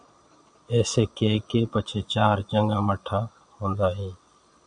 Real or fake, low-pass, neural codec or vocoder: fake; 9.9 kHz; vocoder, 22.05 kHz, 80 mel bands, Vocos